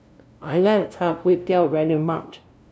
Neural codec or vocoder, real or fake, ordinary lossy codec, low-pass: codec, 16 kHz, 0.5 kbps, FunCodec, trained on LibriTTS, 25 frames a second; fake; none; none